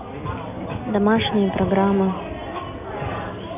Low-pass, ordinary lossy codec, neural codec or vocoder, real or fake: 3.6 kHz; none; none; real